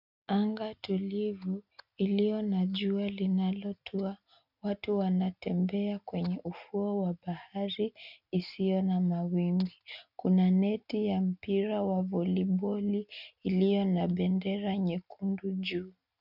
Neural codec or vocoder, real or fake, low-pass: none; real; 5.4 kHz